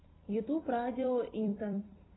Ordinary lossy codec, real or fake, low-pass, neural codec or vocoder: AAC, 16 kbps; fake; 7.2 kHz; vocoder, 44.1 kHz, 128 mel bands every 256 samples, BigVGAN v2